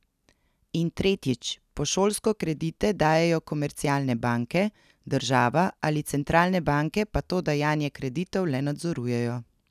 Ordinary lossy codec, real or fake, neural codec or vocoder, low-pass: none; real; none; 14.4 kHz